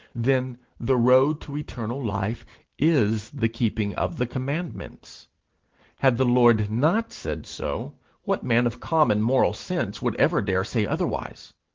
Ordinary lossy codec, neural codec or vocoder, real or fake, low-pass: Opus, 16 kbps; none; real; 7.2 kHz